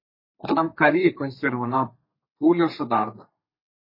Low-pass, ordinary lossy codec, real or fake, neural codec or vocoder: 5.4 kHz; MP3, 24 kbps; fake; codec, 32 kHz, 1.9 kbps, SNAC